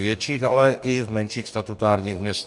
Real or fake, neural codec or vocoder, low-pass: fake; codec, 44.1 kHz, 2.6 kbps, DAC; 10.8 kHz